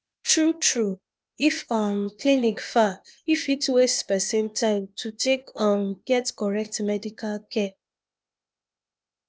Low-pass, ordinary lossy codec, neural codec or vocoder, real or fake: none; none; codec, 16 kHz, 0.8 kbps, ZipCodec; fake